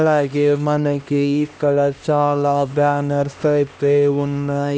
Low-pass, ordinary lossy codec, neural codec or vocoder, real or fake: none; none; codec, 16 kHz, 1 kbps, X-Codec, HuBERT features, trained on LibriSpeech; fake